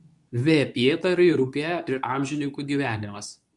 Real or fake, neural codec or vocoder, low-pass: fake; codec, 24 kHz, 0.9 kbps, WavTokenizer, medium speech release version 2; 10.8 kHz